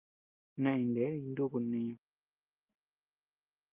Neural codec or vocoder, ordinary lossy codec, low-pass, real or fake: none; Opus, 24 kbps; 3.6 kHz; real